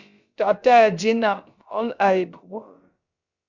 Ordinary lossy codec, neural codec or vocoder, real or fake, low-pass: Opus, 64 kbps; codec, 16 kHz, about 1 kbps, DyCAST, with the encoder's durations; fake; 7.2 kHz